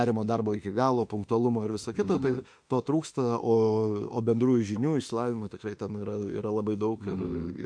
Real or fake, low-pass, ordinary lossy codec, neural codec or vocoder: fake; 9.9 kHz; MP3, 64 kbps; autoencoder, 48 kHz, 32 numbers a frame, DAC-VAE, trained on Japanese speech